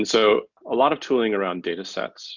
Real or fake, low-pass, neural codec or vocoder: real; 7.2 kHz; none